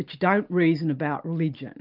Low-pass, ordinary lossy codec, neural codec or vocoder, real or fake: 5.4 kHz; Opus, 32 kbps; none; real